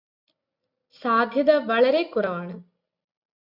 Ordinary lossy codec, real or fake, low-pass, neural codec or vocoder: MP3, 48 kbps; real; 5.4 kHz; none